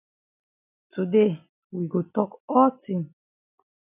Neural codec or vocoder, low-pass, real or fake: none; 3.6 kHz; real